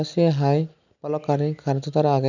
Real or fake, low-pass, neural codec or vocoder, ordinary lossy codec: real; 7.2 kHz; none; none